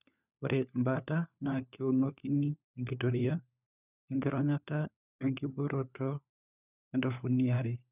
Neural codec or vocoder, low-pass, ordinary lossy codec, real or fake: codec, 16 kHz, 4 kbps, FunCodec, trained on LibriTTS, 50 frames a second; 3.6 kHz; none; fake